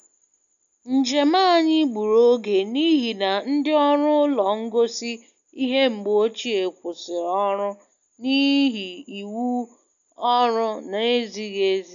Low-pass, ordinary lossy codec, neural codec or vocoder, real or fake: 7.2 kHz; none; none; real